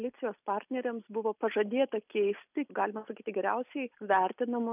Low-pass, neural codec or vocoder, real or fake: 3.6 kHz; none; real